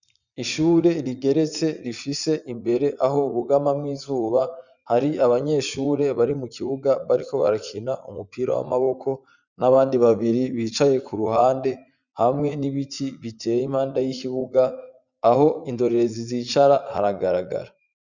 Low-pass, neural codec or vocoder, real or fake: 7.2 kHz; vocoder, 44.1 kHz, 80 mel bands, Vocos; fake